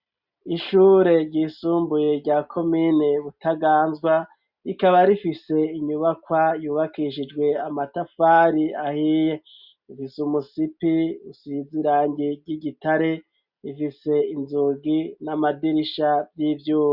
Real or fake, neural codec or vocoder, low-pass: real; none; 5.4 kHz